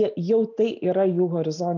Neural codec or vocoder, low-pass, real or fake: none; 7.2 kHz; real